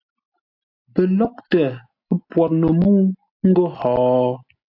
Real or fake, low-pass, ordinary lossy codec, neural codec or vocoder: real; 5.4 kHz; AAC, 32 kbps; none